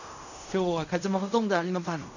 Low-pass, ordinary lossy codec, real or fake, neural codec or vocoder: 7.2 kHz; none; fake; codec, 16 kHz in and 24 kHz out, 0.4 kbps, LongCat-Audio-Codec, fine tuned four codebook decoder